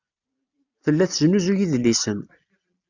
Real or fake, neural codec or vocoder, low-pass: real; none; 7.2 kHz